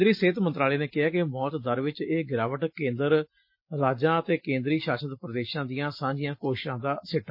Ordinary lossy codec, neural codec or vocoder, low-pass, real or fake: MP3, 32 kbps; none; 5.4 kHz; real